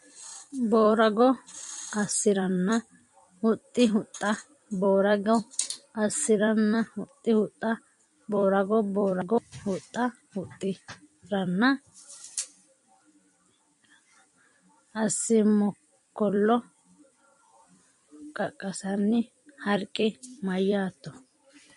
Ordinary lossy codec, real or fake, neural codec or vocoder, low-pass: MP3, 48 kbps; fake; vocoder, 44.1 kHz, 128 mel bands every 256 samples, BigVGAN v2; 14.4 kHz